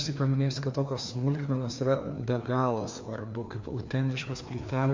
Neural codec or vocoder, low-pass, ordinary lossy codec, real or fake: codec, 16 kHz, 2 kbps, FreqCodec, larger model; 7.2 kHz; MP3, 48 kbps; fake